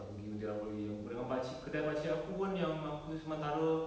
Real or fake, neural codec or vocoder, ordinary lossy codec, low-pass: real; none; none; none